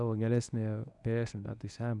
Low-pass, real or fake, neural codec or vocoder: 10.8 kHz; fake; codec, 24 kHz, 0.9 kbps, WavTokenizer, medium speech release version 1